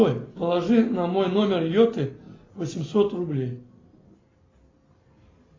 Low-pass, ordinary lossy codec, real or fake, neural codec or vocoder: 7.2 kHz; AAC, 32 kbps; real; none